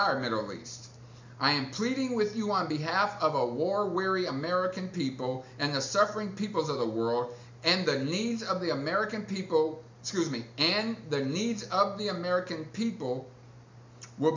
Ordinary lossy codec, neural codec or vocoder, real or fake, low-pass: MP3, 64 kbps; none; real; 7.2 kHz